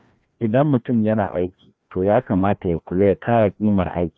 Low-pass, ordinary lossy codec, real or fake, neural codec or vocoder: none; none; fake; codec, 16 kHz, 1 kbps, FreqCodec, larger model